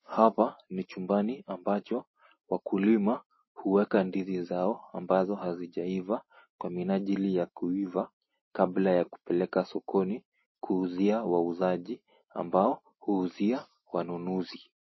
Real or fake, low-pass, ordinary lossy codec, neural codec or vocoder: real; 7.2 kHz; MP3, 24 kbps; none